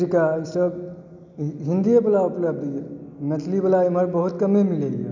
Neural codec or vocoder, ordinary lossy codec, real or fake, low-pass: none; none; real; 7.2 kHz